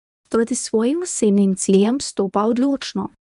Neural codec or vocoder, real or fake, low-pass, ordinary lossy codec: codec, 24 kHz, 0.9 kbps, WavTokenizer, medium speech release version 1; fake; 10.8 kHz; none